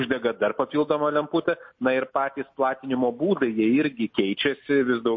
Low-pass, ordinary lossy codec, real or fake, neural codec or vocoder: 7.2 kHz; MP3, 32 kbps; real; none